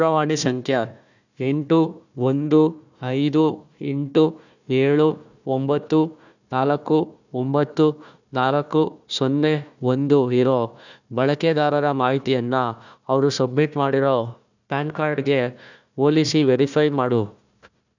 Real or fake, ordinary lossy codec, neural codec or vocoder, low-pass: fake; none; codec, 16 kHz, 1 kbps, FunCodec, trained on Chinese and English, 50 frames a second; 7.2 kHz